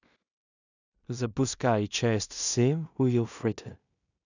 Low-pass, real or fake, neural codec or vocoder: 7.2 kHz; fake; codec, 16 kHz in and 24 kHz out, 0.4 kbps, LongCat-Audio-Codec, two codebook decoder